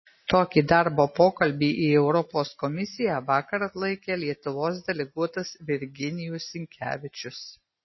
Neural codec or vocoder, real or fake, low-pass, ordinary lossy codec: none; real; 7.2 kHz; MP3, 24 kbps